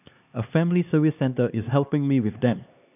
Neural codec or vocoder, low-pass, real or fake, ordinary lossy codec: codec, 16 kHz, 2 kbps, X-Codec, HuBERT features, trained on LibriSpeech; 3.6 kHz; fake; none